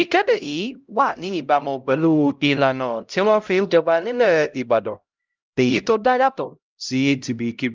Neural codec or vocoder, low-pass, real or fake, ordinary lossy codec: codec, 16 kHz, 0.5 kbps, X-Codec, HuBERT features, trained on LibriSpeech; 7.2 kHz; fake; Opus, 24 kbps